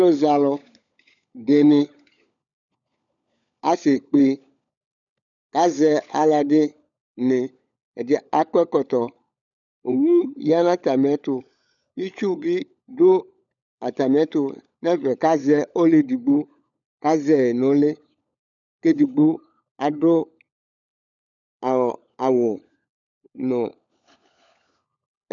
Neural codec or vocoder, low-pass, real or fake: codec, 16 kHz, 16 kbps, FunCodec, trained on LibriTTS, 50 frames a second; 7.2 kHz; fake